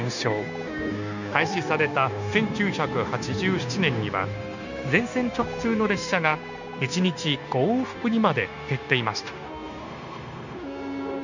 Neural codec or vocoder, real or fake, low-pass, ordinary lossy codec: codec, 16 kHz, 0.9 kbps, LongCat-Audio-Codec; fake; 7.2 kHz; none